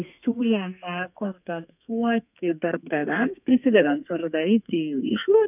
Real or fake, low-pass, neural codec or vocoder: fake; 3.6 kHz; codec, 32 kHz, 1.9 kbps, SNAC